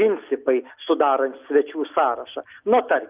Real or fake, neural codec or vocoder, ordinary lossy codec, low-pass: real; none; Opus, 24 kbps; 3.6 kHz